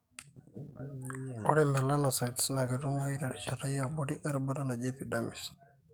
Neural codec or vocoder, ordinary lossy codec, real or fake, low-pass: codec, 44.1 kHz, 7.8 kbps, DAC; none; fake; none